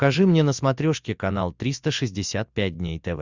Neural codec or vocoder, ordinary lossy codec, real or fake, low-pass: none; Opus, 64 kbps; real; 7.2 kHz